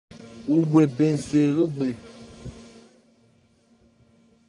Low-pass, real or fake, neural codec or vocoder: 10.8 kHz; fake; codec, 44.1 kHz, 1.7 kbps, Pupu-Codec